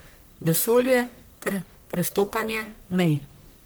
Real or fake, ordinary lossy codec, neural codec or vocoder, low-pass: fake; none; codec, 44.1 kHz, 1.7 kbps, Pupu-Codec; none